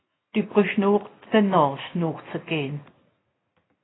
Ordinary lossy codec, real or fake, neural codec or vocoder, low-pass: AAC, 16 kbps; real; none; 7.2 kHz